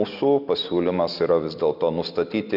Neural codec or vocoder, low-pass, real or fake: none; 5.4 kHz; real